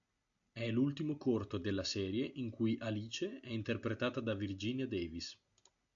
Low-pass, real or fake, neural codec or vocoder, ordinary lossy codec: 7.2 kHz; real; none; AAC, 64 kbps